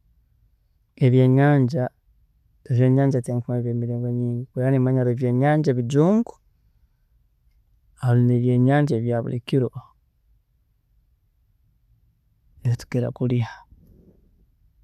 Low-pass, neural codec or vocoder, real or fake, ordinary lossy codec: 14.4 kHz; none; real; none